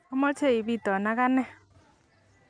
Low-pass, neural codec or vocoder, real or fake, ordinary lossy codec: 9.9 kHz; none; real; none